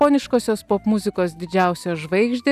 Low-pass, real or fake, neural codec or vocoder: 14.4 kHz; real; none